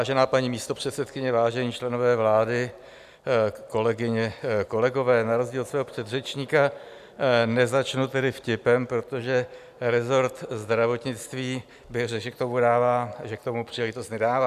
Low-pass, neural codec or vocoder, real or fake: 14.4 kHz; none; real